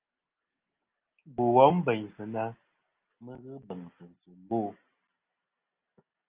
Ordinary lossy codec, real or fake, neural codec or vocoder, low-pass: Opus, 32 kbps; real; none; 3.6 kHz